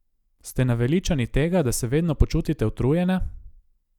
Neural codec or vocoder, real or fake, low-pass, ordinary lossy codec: none; real; 19.8 kHz; none